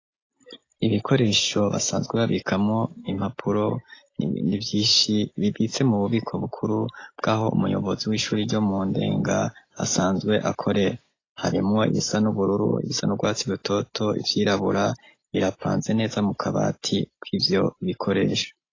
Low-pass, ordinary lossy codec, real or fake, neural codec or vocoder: 7.2 kHz; AAC, 32 kbps; real; none